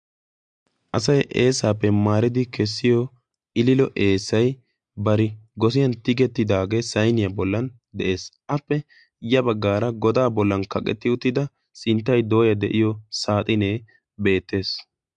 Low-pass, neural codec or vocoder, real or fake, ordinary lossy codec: 9.9 kHz; none; real; MP3, 64 kbps